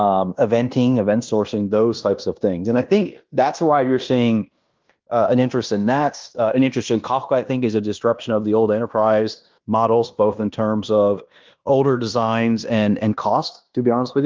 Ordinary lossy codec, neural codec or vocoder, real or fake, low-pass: Opus, 32 kbps; codec, 16 kHz in and 24 kHz out, 0.9 kbps, LongCat-Audio-Codec, fine tuned four codebook decoder; fake; 7.2 kHz